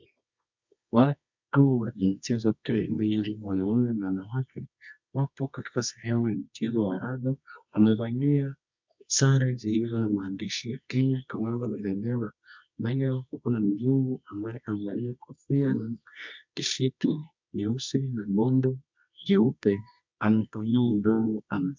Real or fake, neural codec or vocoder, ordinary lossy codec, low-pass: fake; codec, 24 kHz, 0.9 kbps, WavTokenizer, medium music audio release; MP3, 64 kbps; 7.2 kHz